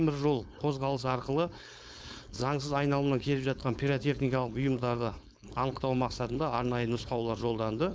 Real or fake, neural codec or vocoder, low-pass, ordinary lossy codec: fake; codec, 16 kHz, 4.8 kbps, FACodec; none; none